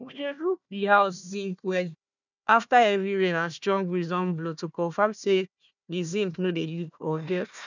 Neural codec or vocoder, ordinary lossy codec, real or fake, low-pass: codec, 16 kHz, 1 kbps, FunCodec, trained on Chinese and English, 50 frames a second; none; fake; 7.2 kHz